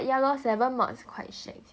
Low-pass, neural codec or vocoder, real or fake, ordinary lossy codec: none; none; real; none